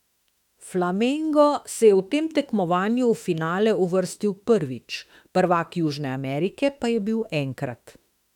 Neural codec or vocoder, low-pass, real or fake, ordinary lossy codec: autoencoder, 48 kHz, 32 numbers a frame, DAC-VAE, trained on Japanese speech; 19.8 kHz; fake; none